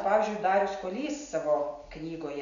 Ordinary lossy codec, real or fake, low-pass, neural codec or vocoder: MP3, 96 kbps; real; 7.2 kHz; none